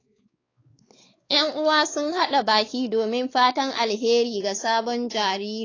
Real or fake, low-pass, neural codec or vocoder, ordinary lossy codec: fake; 7.2 kHz; codec, 16 kHz, 4 kbps, X-Codec, WavLM features, trained on Multilingual LibriSpeech; AAC, 32 kbps